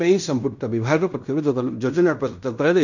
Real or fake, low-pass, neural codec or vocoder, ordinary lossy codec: fake; 7.2 kHz; codec, 16 kHz in and 24 kHz out, 0.9 kbps, LongCat-Audio-Codec, fine tuned four codebook decoder; none